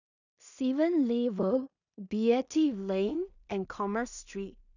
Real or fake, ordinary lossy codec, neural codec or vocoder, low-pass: fake; none; codec, 16 kHz in and 24 kHz out, 0.4 kbps, LongCat-Audio-Codec, two codebook decoder; 7.2 kHz